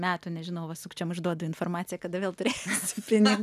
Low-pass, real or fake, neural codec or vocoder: 14.4 kHz; real; none